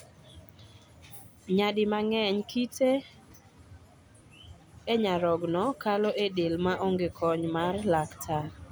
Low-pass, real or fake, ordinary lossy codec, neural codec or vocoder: none; real; none; none